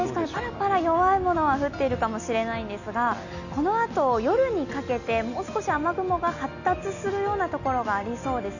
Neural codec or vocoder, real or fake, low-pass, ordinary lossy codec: none; real; 7.2 kHz; none